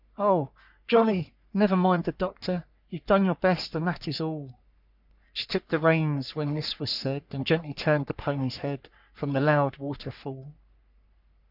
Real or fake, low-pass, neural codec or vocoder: fake; 5.4 kHz; codec, 44.1 kHz, 3.4 kbps, Pupu-Codec